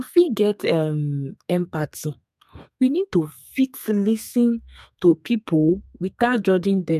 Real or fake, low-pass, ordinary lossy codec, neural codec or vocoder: fake; 14.4 kHz; MP3, 96 kbps; codec, 32 kHz, 1.9 kbps, SNAC